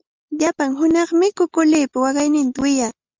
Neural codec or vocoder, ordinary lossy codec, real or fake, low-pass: none; Opus, 32 kbps; real; 7.2 kHz